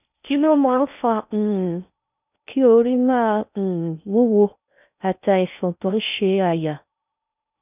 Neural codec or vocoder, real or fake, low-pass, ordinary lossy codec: codec, 16 kHz in and 24 kHz out, 0.6 kbps, FocalCodec, streaming, 4096 codes; fake; 3.6 kHz; none